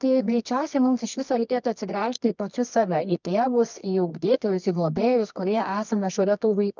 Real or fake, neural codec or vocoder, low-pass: fake; codec, 24 kHz, 0.9 kbps, WavTokenizer, medium music audio release; 7.2 kHz